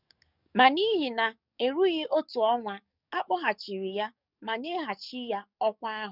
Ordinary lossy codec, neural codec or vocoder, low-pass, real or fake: none; codec, 44.1 kHz, 7.8 kbps, DAC; 5.4 kHz; fake